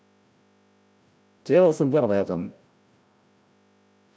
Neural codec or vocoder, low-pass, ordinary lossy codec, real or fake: codec, 16 kHz, 0.5 kbps, FreqCodec, larger model; none; none; fake